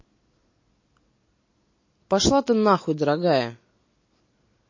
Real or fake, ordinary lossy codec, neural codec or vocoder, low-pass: real; MP3, 32 kbps; none; 7.2 kHz